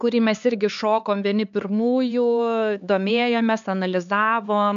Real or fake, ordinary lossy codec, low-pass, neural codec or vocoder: fake; MP3, 64 kbps; 7.2 kHz; codec, 16 kHz, 4 kbps, X-Codec, HuBERT features, trained on LibriSpeech